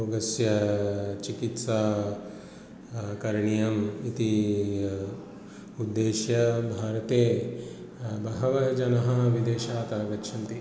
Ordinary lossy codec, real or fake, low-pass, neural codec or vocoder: none; real; none; none